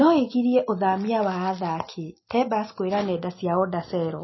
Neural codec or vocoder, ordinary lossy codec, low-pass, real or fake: none; MP3, 24 kbps; 7.2 kHz; real